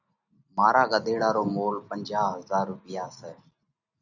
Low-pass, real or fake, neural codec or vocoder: 7.2 kHz; real; none